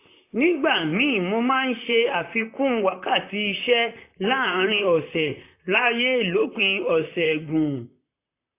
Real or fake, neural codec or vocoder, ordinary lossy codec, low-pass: fake; vocoder, 44.1 kHz, 128 mel bands, Pupu-Vocoder; AAC, 24 kbps; 3.6 kHz